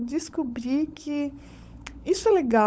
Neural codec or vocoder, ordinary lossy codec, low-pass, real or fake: codec, 16 kHz, 16 kbps, FunCodec, trained on LibriTTS, 50 frames a second; none; none; fake